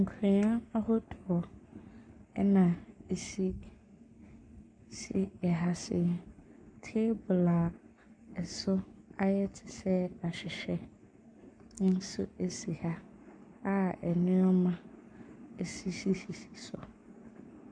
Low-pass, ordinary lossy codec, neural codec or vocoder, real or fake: 9.9 kHz; MP3, 96 kbps; codec, 44.1 kHz, 7.8 kbps, Pupu-Codec; fake